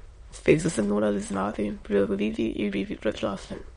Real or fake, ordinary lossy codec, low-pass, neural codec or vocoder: fake; MP3, 48 kbps; 9.9 kHz; autoencoder, 22.05 kHz, a latent of 192 numbers a frame, VITS, trained on many speakers